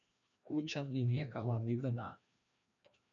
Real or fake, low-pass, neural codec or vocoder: fake; 7.2 kHz; codec, 16 kHz, 1 kbps, FreqCodec, larger model